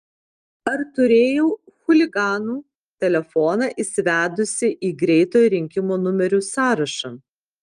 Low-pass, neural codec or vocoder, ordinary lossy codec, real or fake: 9.9 kHz; none; Opus, 32 kbps; real